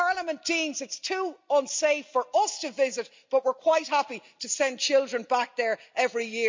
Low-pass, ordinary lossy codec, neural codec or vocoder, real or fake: 7.2 kHz; MP3, 64 kbps; none; real